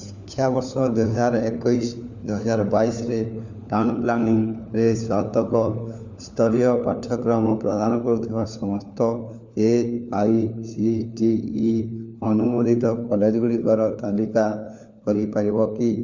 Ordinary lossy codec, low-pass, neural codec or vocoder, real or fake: none; 7.2 kHz; codec, 16 kHz, 4 kbps, FunCodec, trained on LibriTTS, 50 frames a second; fake